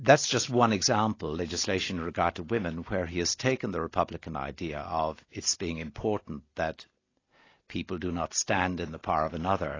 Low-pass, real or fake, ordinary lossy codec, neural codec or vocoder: 7.2 kHz; real; AAC, 32 kbps; none